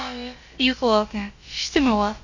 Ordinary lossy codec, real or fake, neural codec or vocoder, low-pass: Opus, 64 kbps; fake; codec, 16 kHz, about 1 kbps, DyCAST, with the encoder's durations; 7.2 kHz